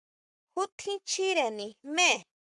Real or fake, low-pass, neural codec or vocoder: fake; 10.8 kHz; codec, 44.1 kHz, 7.8 kbps, Pupu-Codec